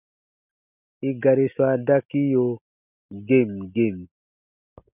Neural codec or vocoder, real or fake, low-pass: none; real; 3.6 kHz